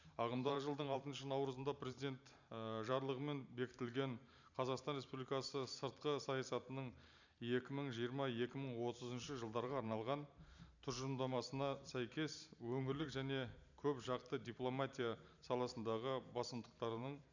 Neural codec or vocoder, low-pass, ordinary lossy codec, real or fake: vocoder, 44.1 kHz, 128 mel bands every 512 samples, BigVGAN v2; 7.2 kHz; none; fake